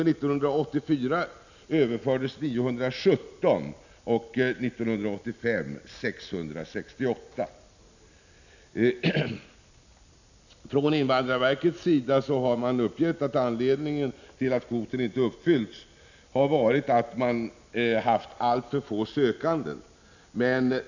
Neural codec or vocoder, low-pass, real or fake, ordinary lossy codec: none; 7.2 kHz; real; none